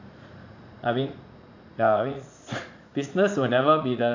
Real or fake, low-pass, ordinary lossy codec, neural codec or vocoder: fake; 7.2 kHz; AAC, 48 kbps; vocoder, 44.1 kHz, 80 mel bands, Vocos